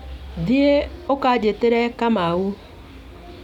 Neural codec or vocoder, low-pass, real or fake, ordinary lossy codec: none; 19.8 kHz; real; none